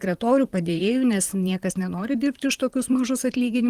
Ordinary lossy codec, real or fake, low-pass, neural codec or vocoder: Opus, 24 kbps; fake; 14.4 kHz; vocoder, 44.1 kHz, 128 mel bands, Pupu-Vocoder